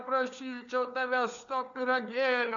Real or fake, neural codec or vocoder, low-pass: fake; codec, 16 kHz, 4 kbps, FunCodec, trained on LibriTTS, 50 frames a second; 7.2 kHz